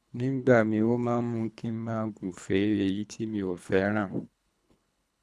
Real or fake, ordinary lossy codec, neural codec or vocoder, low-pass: fake; none; codec, 24 kHz, 3 kbps, HILCodec; none